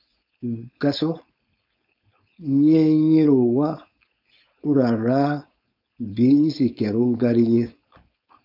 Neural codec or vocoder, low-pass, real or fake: codec, 16 kHz, 4.8 kbps, FACodec; 5.4 kHz; fake